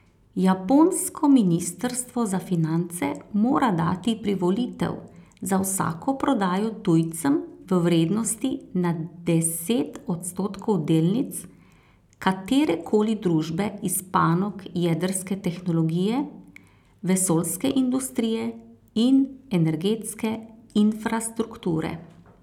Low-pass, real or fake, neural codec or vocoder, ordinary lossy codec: 19.8 kHz; real; none; none